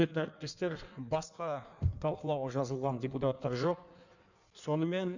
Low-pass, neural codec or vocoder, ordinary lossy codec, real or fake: 7.2 kHz; codec, 16 kHz in and 24 kHz out, 1.1 kbps, FireRedTTS-2 codec; none; fake